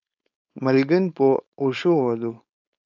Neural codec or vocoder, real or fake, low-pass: codec, 16 kHz, 4.8 kbps, FACodec; fake; 7.2 kHz